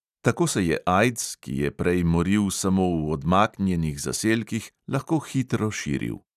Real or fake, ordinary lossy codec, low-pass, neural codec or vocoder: real; none; 14.4 kHz; none